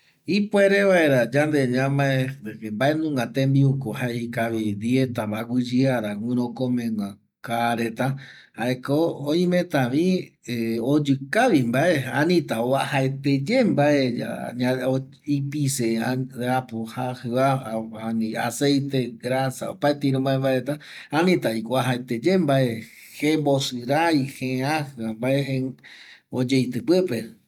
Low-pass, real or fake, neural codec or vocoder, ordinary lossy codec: 19.8 kHz; real; none; none